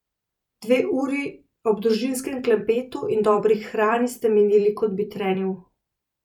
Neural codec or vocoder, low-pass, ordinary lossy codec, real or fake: vocoder, 44.1 kHz, 128 mel bands every 256 samples, BigVGAN v2; 19.8 kHz; none; fake